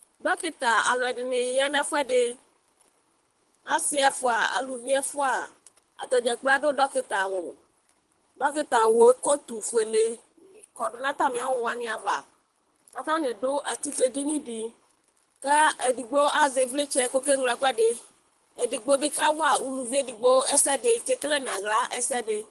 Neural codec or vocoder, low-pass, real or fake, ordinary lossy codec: codec, 24 kHz, 3 kbps, HILCodec; 10.8 kHz; fake; Opus, 24 kbps